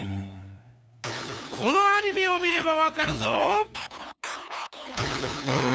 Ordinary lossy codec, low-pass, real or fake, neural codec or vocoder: none; none; fake; codec, 16 kHz, 2 kbps, FunCodec, trained on LibriTTS, 25 frames a second